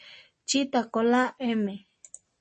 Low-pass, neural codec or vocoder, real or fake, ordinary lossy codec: 10.8 kHz; none; real; MP3, 32 kbps